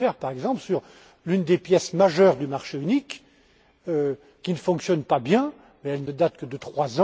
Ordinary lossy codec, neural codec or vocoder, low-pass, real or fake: none; none; none; real